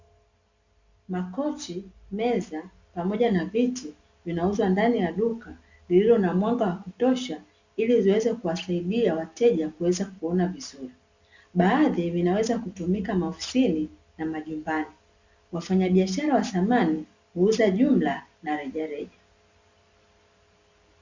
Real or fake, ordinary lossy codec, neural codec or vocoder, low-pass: real; Opus, 64 kbps; none; 7.2 kHz